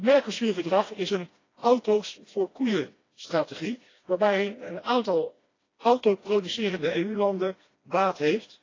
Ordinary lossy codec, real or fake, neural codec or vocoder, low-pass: AAC, 32 kbps; fake; codec, 16 kHz, 1 kbps, FreqCodec, smaller model; 7.2 kHz